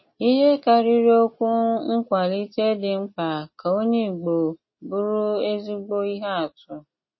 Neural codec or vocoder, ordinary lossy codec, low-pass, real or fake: none; MP3, 24 kbps; 7.2 kHz; real